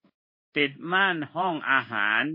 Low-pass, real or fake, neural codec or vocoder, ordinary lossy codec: 5.4 kHz; fake; codec, 16 kHz in and 24 kHz out, 1 kbps, XY-Tokenizer; MP3, 24 kbps